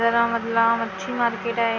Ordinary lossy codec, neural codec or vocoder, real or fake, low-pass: none; none; real; 7.2 kHz